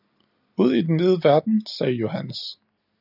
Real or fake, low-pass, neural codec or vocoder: real; 5.4 kHz; none